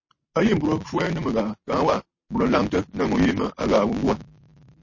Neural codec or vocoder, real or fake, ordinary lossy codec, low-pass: none; real; MP3, 32 kbps; 7.2 kHz